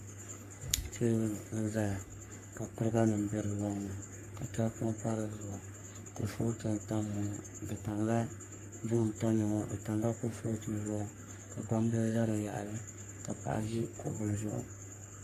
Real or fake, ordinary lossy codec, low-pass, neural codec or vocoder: fake; MP3, 64 kbps; 14.4 kHz; codec, 44.1 kHz, 3.4 kbps, Pupu-Codec